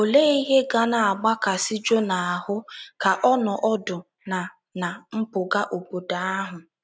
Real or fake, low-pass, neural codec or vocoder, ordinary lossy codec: real; none; none; none